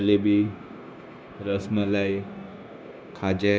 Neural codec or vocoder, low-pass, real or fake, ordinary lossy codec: codec, 16 kHz, 0.9 kbps, LongCat-Audio-Codec; none; fake; none